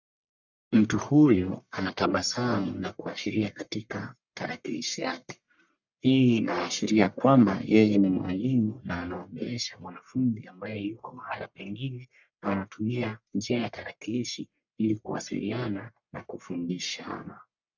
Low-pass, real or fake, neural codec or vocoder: 7.2 kHz; fake; codec, 44.1 kHz, 1.7 kbps, Pupu-Codec